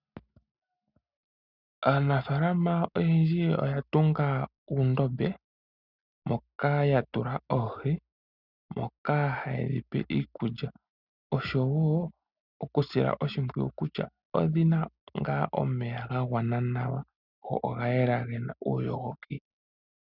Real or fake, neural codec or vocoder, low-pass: real; none; 5.4 kHz